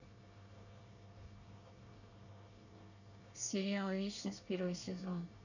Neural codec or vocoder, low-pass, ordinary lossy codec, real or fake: codec, 24 kHz, 1 kbps, SNAC; 7.2 kHz; none; fake